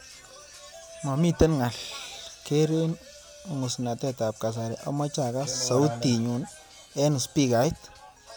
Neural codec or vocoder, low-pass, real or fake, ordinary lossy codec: none; none; real; none